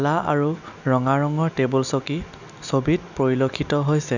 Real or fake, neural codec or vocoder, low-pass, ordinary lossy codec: real; none; 7.2 kHz; none